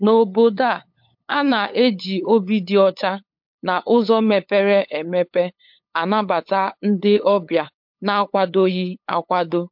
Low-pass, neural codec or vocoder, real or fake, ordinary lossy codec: 5.4 kHz; codec, 16 kHz, 8 kbps, FunCodec, trained on LibriTTS, 25 frames a second; fake; MP3, 48 kbps